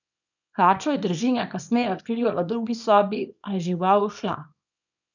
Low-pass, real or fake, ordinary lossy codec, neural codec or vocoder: 7.2 kHz; fake; none; codec, 24 kHz, 0.9 kbps, WavTokenizer, small release